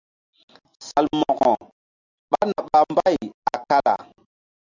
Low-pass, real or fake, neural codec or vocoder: 7.2 kHz; real; none